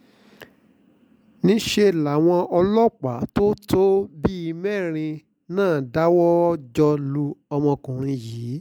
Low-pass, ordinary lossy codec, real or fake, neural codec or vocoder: 19.8 kHz; MP3, 96 kbps; real; none